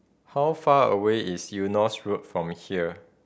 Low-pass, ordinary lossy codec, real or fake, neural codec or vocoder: none; none; real; none